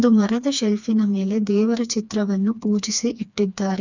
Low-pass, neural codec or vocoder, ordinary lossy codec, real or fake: 7.2 kHz; codec, 16 kHz, 2 kbps, FreqCodec, smaller model; none; fake